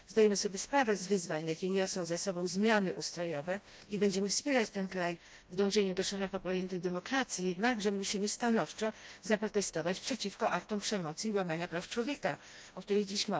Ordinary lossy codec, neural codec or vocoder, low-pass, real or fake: none; codec, 16 kHz, 1 kbps, FreqCodec, smaller model; none; fake